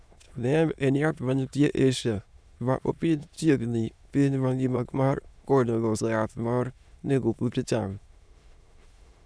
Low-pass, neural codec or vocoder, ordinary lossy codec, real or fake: none; autoencoder, 22.05 kHz, a latent of 192 numbers a frame, VITS, trained on many speakers; none; fake